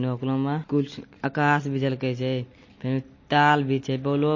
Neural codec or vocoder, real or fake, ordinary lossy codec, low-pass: none; real; MP3, 32 kbps; 7.2 kHz